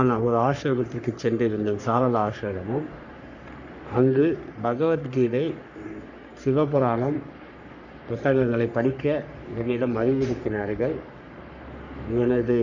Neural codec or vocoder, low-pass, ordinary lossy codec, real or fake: codec, 44.1 kHz, 3.4 kbps, Pupu-Codec; 7.2 kHz; none; fake